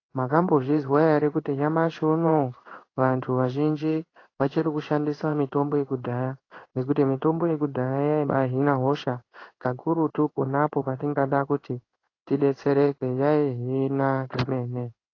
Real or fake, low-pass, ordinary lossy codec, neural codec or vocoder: fake; 7.2 kHz; AAC, 32 kbps; codec, 16 kHz in and 24 kHz out, 1 kbps, XY-Tokenizer